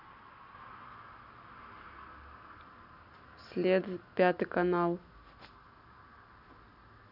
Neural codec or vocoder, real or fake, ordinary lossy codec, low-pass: none; real; none; 5.4 kHz